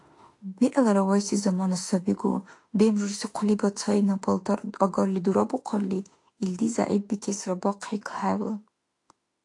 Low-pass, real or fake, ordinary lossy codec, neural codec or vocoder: 10.8 kHz; fake; AAC, 48 kbps; autoencoder, 48 kHz, 32 numbers a frame, DAC-VAE, trained on Japanese speech